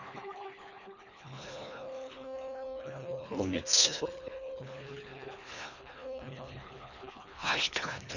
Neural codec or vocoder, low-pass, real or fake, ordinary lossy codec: codec, 24 kHz, 1.5 kbps, HILCodec; 7.2 kHz; fake; none